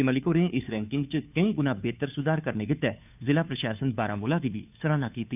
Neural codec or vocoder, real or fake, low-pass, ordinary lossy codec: codec, 16 kHz, 2 kbps, FunCodec, trained on Chinese and English, 25 frames a second; fake; 3.6 kHz; none